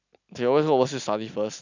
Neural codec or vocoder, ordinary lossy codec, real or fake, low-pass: none; none; real; 7.2 kHz